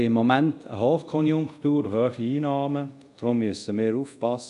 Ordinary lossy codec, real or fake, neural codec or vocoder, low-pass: none; fake; codec, 24 kHz, 0.5 kbps, DualCodec; 10.8 kHz